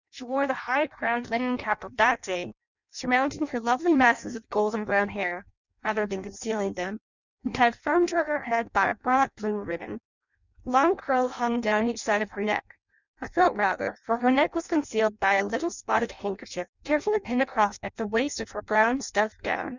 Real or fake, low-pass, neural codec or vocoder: fake; 7.2 kHz; codec, 16 kHz in and 24 kHz out, 0.6 kbps, FireRedTTS-2 codec